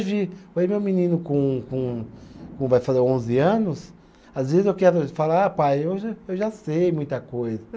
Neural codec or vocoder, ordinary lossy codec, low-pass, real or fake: none; none; none; real